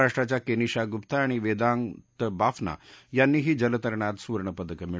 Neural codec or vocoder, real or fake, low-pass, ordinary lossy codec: none; real; none; none